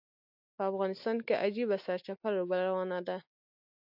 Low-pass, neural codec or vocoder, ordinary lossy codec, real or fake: 5.4 kHz; none; AAC, 48 kbps; real